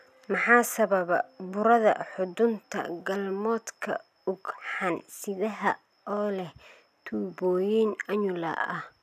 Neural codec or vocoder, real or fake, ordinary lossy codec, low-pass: none; real; none; 14.4 kHz